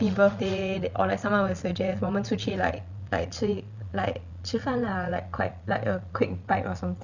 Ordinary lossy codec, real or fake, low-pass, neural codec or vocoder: none; fake; 7.2 kHz; vocoder, 22.05 kHz, 80 mel bands, Vocos